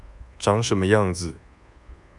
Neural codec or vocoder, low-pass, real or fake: codec, 24 kHz, 1.2 kbps, DualCodec; 10.8 kHz; fake